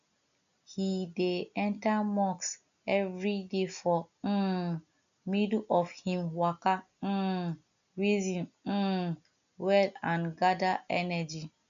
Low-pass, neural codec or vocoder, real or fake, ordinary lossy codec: 7.2 kHz; none; real; AAC, 96 kbps